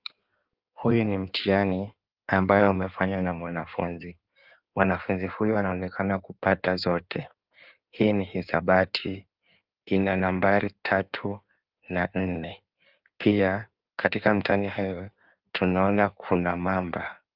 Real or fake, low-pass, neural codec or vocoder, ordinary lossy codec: fake; 5.4 kHz; codec, 16 kHz in and 24 kHz out, 1.1 kbps, FireRedTTS-2 codec; Opus, 32 kbps